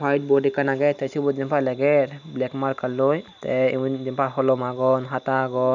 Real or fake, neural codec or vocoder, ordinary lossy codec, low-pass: real; none; none; 7.2 kHz